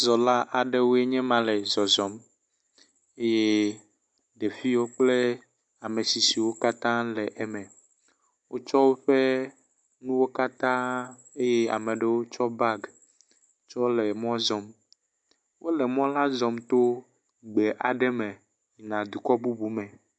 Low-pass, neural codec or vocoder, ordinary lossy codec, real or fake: 9.9 kHz; none; MP3, 64 kbps; real